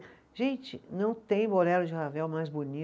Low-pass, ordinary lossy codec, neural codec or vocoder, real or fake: none; none; none; real